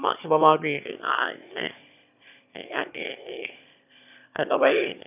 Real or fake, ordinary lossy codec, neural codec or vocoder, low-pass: fake; none; autoencoder, 22.05 kHz, a latent of 192 numbers a frame, VITS, trained on one speaker; 3.6 kHz